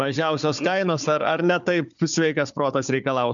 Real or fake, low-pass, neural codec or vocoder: fake; 7.2 kHz; codec, 16 kHz, 4 kbps, FunCodec, trained on Chinese and English, 50 frames a second